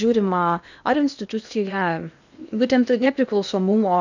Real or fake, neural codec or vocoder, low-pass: fake; codec, 16 kHz in and 24 kHz out, 0.8 kbps, FocalCodec, streaming, 65536 codes; 7.2 kHz